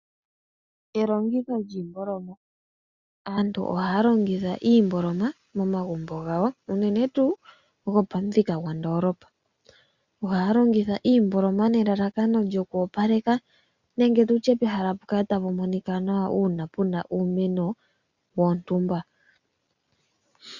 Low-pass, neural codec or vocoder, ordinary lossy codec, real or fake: 7.2 kHz; none; Opus, 64 kbps; real